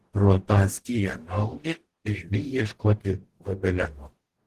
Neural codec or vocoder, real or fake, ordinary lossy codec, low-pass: codec, 44.1 kHz, 0.9 kbps, DAC; fake; Opus, 16 kbps; 14.4 kHz